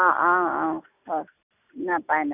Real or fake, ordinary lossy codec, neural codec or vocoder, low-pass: real; none; none; 3.6 kHz